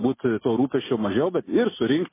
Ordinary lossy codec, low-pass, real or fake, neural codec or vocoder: MP3, 16 kbps; 3.6 kHz; real; none